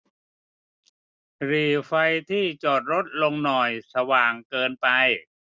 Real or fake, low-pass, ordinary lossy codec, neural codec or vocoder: real; none; none; none